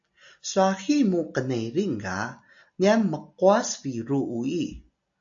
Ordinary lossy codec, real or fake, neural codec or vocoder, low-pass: AAC, 64 kbps; real; none; 7.2 kHz